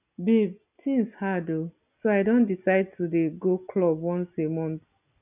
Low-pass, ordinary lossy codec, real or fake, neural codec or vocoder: 3.6 kHz; none; real; none